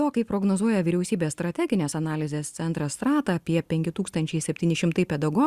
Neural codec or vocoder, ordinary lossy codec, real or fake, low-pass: none; Opus, 64 kbps; real; 14.4 kHz